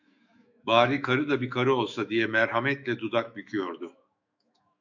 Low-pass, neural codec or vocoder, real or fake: 7.2 kHz; autoencoder, 48 kHz, 128 numbers a frame, DAC-VAE, trained on Japanese speech; fake